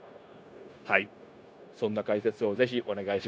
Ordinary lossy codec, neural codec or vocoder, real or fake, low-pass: none; codec, 16 kHz, 0.9 kbps, LongCat-Audio-Codec; fake; none